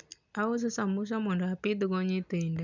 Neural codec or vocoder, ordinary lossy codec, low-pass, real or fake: none; none; 7.2 kHz; real